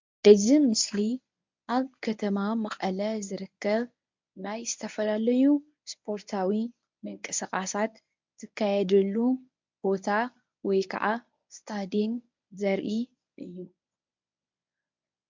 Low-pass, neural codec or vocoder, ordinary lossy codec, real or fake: 7.2 kHz; codec, 24 kHz, 0.9 kbps, WavTokenizer, medium speech release version 1; MP3, 64 kbps; fake